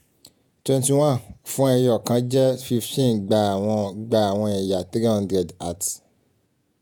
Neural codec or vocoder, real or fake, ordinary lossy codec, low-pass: vocoder, 48 kHz, 128 mel bands, Vocos; fake; none; none